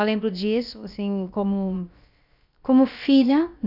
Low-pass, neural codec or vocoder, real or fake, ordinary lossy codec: 5.4 kHz; codec, 16 kHz, about 1 kbps, DyCAST, with the encoder's durations; fake; none